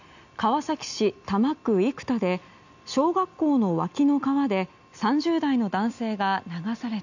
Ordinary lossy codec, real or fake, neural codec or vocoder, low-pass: none; real; none; 7.2 kHz